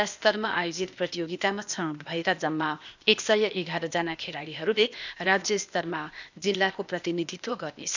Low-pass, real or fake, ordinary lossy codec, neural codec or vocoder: 7.2 kHz; fake; none; codec, 16 kHz, 0.8 kbps, ZipCodec